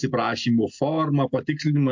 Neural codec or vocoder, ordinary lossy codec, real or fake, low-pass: none; MP3, 48 kbps; real; 7.2 kHz